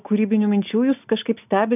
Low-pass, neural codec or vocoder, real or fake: 3.6 kHz; none; real